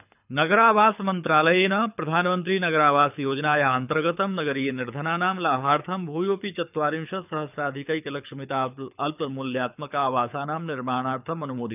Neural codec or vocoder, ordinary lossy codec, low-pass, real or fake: codec, 24 kHz, 6 kbps, HILCodec; none; 3.6 kHz; fake